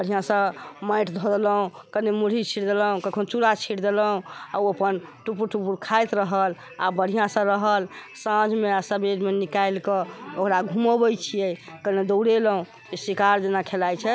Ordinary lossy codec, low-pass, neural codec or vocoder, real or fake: none; none; none; real